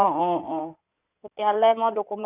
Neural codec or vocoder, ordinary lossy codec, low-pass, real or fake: codec, 16 kHz in and 24 kHz out, 2.2 kbps, FireRedTTS-2 codec; none; 3.6 kHz; fake